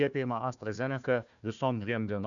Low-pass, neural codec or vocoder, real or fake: 7.2 kHz; codec, 16 kHz, 1 kbps, FunCodec, trained on Chinese and English, 50 frames a second; fake